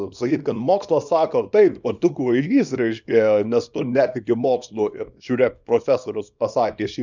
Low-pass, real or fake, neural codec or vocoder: 7.2 kHz; fake; codec, 24 kHz, 0.9 kbps, WavTokenizer, small release